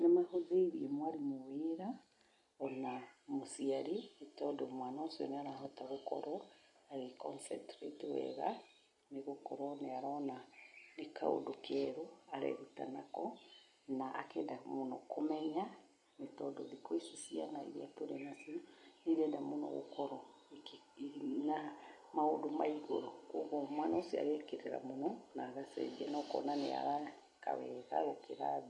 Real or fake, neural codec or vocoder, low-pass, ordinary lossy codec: real; none; 10.8 kHz; MP3, 48 kbps